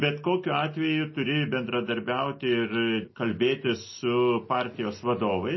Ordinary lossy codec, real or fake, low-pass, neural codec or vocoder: MP3, 24 kbps; real; 7.2 kHz; none